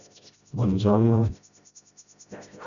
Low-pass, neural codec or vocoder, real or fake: 7.2 kHz; codec, 16 kHz, 0.5 kbps, FreqCodec, smaller model; fake